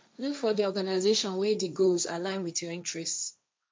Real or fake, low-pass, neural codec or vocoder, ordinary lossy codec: fake; none; codec, 16 kHz, 1.1 kbps, Voila-Tokenizer; none